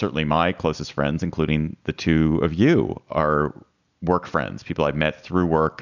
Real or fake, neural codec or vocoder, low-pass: real; none; 7.2 kHz